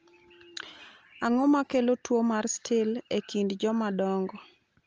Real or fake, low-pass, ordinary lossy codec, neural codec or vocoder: real; 7.2 kHz; Opus, 24 kbps; none